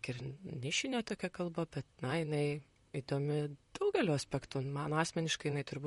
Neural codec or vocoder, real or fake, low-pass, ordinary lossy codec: vocoder, 44.1 kHz, 128 mel bands, Pupu-Vocoder; fake; 19.8 kHz; MP3, 48 kbps